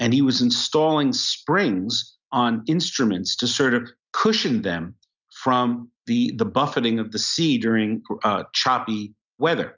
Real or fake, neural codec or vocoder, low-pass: real; none; 7.2 kHz